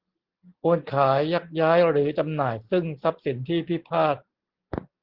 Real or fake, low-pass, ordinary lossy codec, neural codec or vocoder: real; 5.4 kHz; Opus, 16 kbps; none